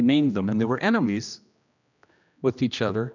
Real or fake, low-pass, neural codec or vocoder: fake; 7.2 kHz; codec, 16 kHz, 1 kbps, X-Codec, HuBERT features, trained on general audio